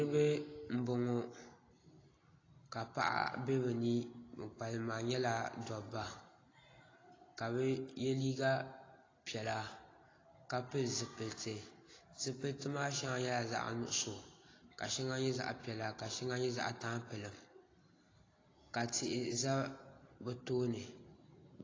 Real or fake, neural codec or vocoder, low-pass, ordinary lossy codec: real; none; 7.2 kHz; AAC, 32 kbps